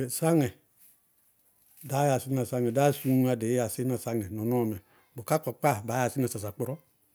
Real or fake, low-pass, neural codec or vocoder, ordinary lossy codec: real; none; none; none